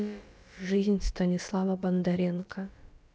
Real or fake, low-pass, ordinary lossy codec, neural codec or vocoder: fake; none; none; codec, 16 kHz, about 1 kbps, DyCAST, with the encoder's durations